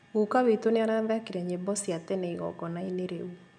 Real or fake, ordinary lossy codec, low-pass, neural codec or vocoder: real; none; 9.9 kHz; none